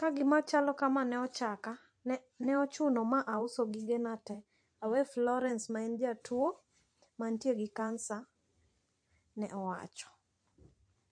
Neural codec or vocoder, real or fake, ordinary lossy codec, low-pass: vocoder, 44.1 kHz, 128 mel bands every 512 samples, BigVGAN v2; fake; MP3, 48 kbps; 9.9 kHz